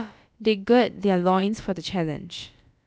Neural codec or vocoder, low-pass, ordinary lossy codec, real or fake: codec, 16 kHz, about 1 kbps, DyCAST, with the encoder's durations; none; none; fake